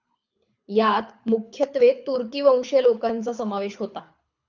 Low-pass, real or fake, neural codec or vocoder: 7.2 kHz; fake; codec, 24 kHz, 6 kbps, HILCodec